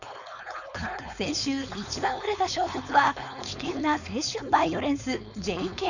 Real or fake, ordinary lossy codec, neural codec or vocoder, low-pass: fake; none; codec, 16 kHz, 4.8 kbps, FACodec; 7.2 kHz